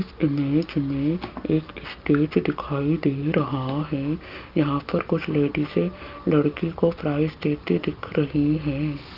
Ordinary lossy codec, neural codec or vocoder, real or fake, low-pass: Opus, 24 kbps; none; real; 5.4 kHz